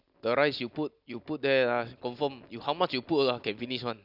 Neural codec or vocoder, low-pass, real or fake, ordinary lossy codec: none; 5.4 kHz; real; none